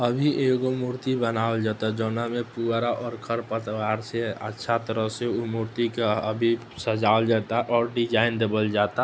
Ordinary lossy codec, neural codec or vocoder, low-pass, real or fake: none; none; none; real